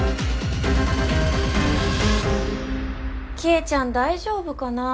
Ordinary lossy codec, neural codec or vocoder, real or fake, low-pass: none; none; real; none